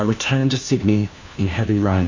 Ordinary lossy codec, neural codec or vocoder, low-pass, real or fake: AAC, 48 kbps; codec, 16 kHz, 1 kbps, FunCodec, trained on LibriTTS, 50 frames a second; 7.2 kHz; fake